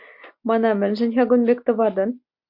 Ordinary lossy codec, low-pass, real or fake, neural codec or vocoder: MP3, 48 kbps; 5.4 kHz; real; none